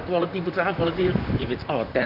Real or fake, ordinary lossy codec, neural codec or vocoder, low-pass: fake; none; vocoder, 44.1 kHz, 128 mel bands, Pupu-Vocoder; 5.4 kHz